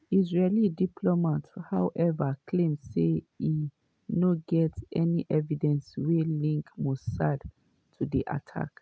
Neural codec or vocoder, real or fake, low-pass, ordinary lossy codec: none; real; none; none